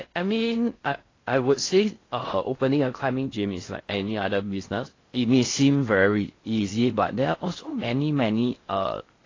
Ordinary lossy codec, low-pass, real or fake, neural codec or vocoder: AAC, 32 kbps; 7.2 kHz; fake; codec, 16 kHz in and 24 kHz out, 0.6 kbps, FocalCodec, streaming, 2048 codes